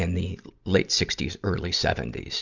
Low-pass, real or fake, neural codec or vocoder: 7.2 kHz; real; none